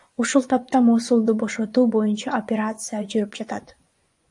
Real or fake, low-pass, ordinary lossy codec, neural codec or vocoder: fake; 10.8 kHz; AAC, 64 kbps; vocoder, 24 kHz, 100 mel bands, Vocos